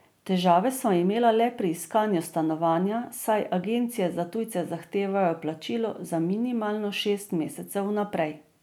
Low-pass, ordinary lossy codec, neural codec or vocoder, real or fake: none; none; none; real